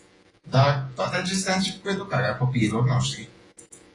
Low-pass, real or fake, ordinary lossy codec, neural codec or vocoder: 10.8 kHz; fake; AAC, 32 kbps; vocoder, 48 kHz, 128 mel bands, Vocos